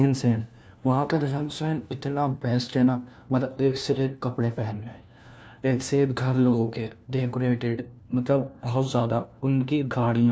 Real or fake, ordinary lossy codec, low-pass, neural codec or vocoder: fake; none; none; codec, 16 kHz, 1 kbps, FunCodec, trained on LibriTTS, 50 frames a second